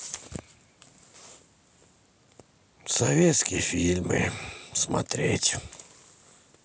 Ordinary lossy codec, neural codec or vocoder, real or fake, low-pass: none; none; real; none